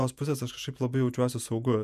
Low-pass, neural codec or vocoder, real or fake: 14.4 kHz; vocoder, 48 kHz, 128 mel bands, Vocos; fake